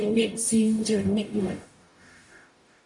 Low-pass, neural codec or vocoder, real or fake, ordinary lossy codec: 10.8 kHz; codec, 44.1 kHz, 0.9 kbps, DAC; fake; AAC, 64 kbps